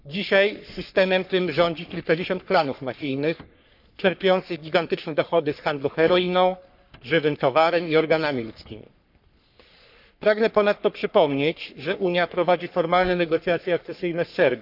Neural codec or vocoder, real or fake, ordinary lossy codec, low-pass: codec, 44.1 kHz, 3.4 kbps, Pupu-Codec; fake; none; 5.4 kHz